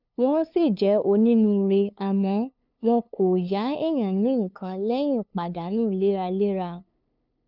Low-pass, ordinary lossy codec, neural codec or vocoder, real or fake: 5.4 kHz; none; codec, 16 kHz, 2 kbps, FunCodec, trained on LibriTTS, 25 frames a second; fake